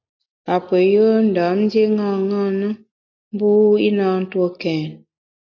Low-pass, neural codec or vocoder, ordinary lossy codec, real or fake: 7.2 kHz; none; AAC, 48 kbps; real